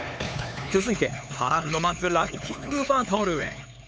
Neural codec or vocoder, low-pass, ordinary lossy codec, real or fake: codec, 16 kHz, 4 kbps, X-Codec, HuBERT features, trained on LibriSpeech; none; none; fake